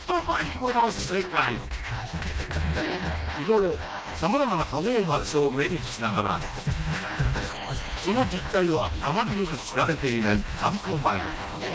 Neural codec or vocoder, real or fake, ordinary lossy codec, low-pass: codec, 16 kHz, 1 kbps, FreqCodec, smaller model; fake; none; none